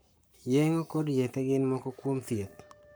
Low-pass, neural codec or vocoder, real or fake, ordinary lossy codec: none; codec, 44.1 kHz, 7.8 kbps, Pupu-Codec; fake; none